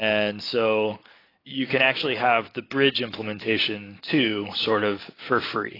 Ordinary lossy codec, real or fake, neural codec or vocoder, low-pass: AAC, 24 kbps; real; none; 5.4 kHz